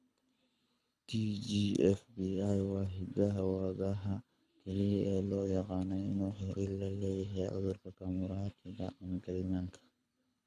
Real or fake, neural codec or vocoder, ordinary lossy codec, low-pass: fake; codec, 24 kHz, 6 kbps, HILCodec; none; none